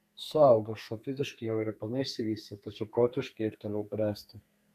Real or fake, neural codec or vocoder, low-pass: fake; codec, 32 kHz, 1.9 kbps, SNAC; 14.4 kHz